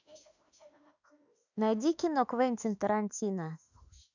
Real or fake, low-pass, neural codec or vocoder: fake; 7.2 kHz; autoencoder, 48 kHz, 32 numbers a frame, DAC-VAE, trained on Japanese speech